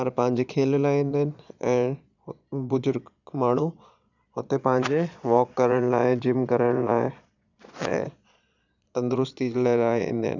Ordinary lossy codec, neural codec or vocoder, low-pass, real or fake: none; vocoder, 22.05 kHz, 80 mel bands, Vocos; 7.2 kHz; fake